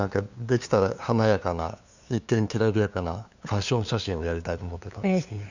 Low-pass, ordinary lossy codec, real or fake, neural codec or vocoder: 7.2 kHz; none; fake; codec, 16 kHz, 2 kbps, FunCodec, trained on LibriTTS, 25 frames a second